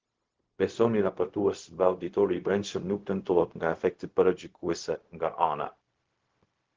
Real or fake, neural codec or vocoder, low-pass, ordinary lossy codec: fake; codec, 16 kHz, 0.4 kbps, LongCat-Audio-Codec; 7.2 kHz; Opus, 16 kbps